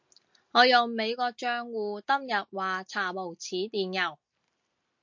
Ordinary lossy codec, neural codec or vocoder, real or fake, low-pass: MP3, 48 kbps; none; real; 7.2 kHz